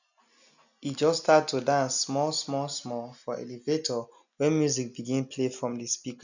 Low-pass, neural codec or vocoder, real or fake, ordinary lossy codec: 7.2 kHz; none; real; none